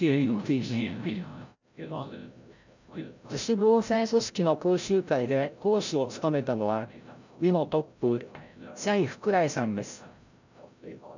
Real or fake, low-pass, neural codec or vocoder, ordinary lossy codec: fake; 7.2 kHz; codec, 16 kHz, 0.5 kbps, FreqCodec, larger model; none